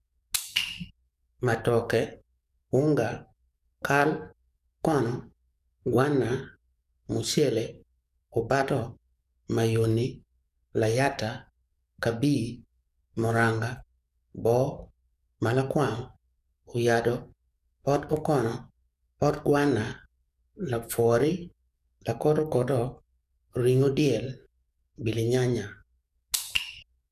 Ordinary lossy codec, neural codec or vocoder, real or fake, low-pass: none; codec, 44.1 kHz, 7.8 kbps, DAC; fake; 14.4 kHz